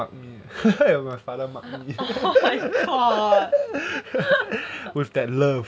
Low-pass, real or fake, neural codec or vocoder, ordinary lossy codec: none; real; none; none